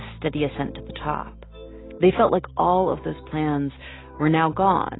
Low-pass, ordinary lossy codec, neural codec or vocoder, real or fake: 7.2 kHz; AAC, 16 kbps; none; real